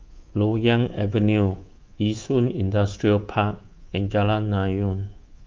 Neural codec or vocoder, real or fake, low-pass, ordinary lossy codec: codec, 24 kHz, 3.1 kbps, DualCodec; fake; 7.2 kHz; Opus, 24 kbps